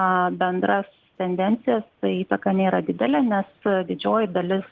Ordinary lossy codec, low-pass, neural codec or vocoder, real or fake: Opus, 16 kbps; 7.2 kHz; none; real